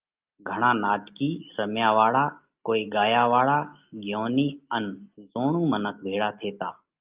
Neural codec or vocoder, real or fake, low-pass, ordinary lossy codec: none; real; 3.6 kHz; Opus, 24 kbps